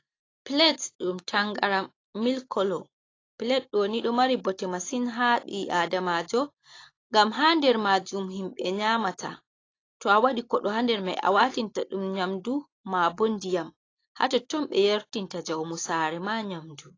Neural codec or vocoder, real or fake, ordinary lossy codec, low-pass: none; real; AAC, 32 kbps; 7.2 kHz